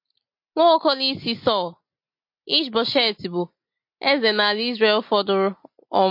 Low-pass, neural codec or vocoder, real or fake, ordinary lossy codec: 5.4 kHz; none; real; MP3, 32 kbps